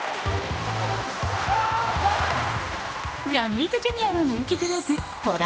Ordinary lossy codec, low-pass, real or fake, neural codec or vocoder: none; none; fake; codec, 16 kHz, 1 kbps, X-Codec, HuBERT features, trained on general audio